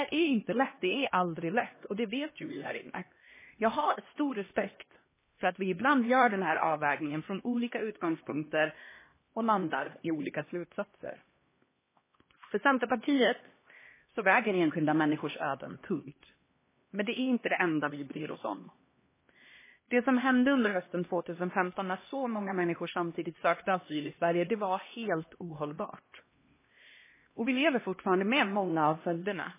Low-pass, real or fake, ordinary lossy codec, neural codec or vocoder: 3.6 kHz; fake; MP3, 16 kbps; codec, 16 kHz, 1 kbps, X-Codec, HuBERT features, trained on LibriSpeech